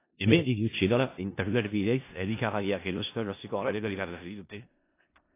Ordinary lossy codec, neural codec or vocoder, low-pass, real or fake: AAC, 24 kbps; codec, 16 kHz in and 24 kHz out, 0.4 kbps, LongCat-Audio-Codec, four codebook decoder; 3.6 kHz; fake